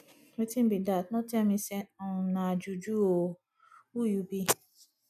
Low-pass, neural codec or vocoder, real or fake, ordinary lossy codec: 14.4 kHz; none; real; none